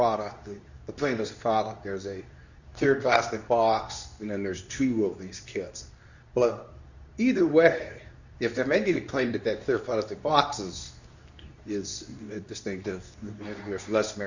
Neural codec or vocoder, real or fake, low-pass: codec, 24 kHz, 0.9 kbps, WavTokenizer, medium speech release version 2; fake; 7.2 kHz